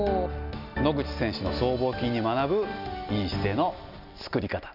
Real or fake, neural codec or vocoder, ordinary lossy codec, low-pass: real; none; none; 5.4 kHz